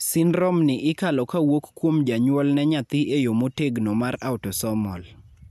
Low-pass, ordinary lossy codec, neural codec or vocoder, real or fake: 14.4 kHz; none; none; real